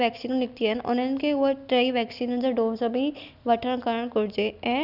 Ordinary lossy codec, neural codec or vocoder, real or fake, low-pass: none; none; real; 5.4 kHz